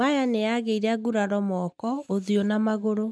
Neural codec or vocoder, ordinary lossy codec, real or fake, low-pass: none; none; real; none